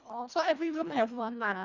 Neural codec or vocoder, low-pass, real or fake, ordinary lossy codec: codec, 24 kHz, 1.5 kbps, HILCodec; 7.2 kHz; fake; none